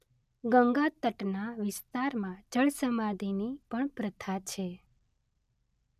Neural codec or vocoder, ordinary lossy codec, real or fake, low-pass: none; none; real; 14.4 kHz